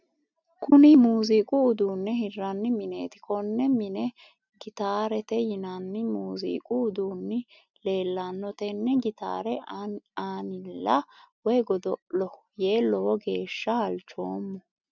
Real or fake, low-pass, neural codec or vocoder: real; 7.2 kHz; none